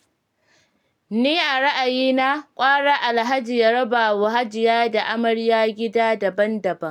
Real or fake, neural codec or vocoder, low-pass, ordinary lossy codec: real; none; 19.8 kHz; none